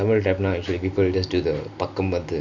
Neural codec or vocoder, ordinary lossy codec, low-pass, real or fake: none; none; 7.2 kHz; real